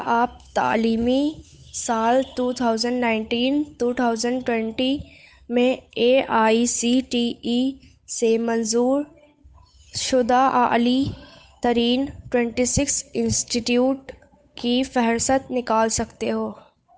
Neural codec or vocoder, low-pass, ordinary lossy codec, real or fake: codec, 16 kHz, 8 kbps, FunCodec, trained on Chinese and English, 25 frames a second; none; none; fake